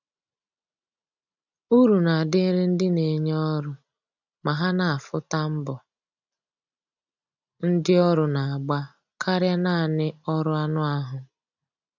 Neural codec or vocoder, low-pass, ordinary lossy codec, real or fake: none; 7.2 kHz; none; real